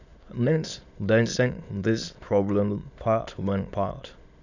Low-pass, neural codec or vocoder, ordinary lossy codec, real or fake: 7.2 kHz; autoencoder, 22.05 kHz, a latent of 192 numbers a frame, VITS, trained on many speakers; none; fake